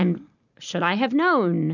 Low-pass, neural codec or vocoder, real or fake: 7.2 kHz; none; real